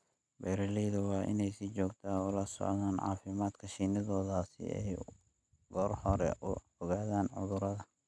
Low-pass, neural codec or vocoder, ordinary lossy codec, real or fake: none; none; none; real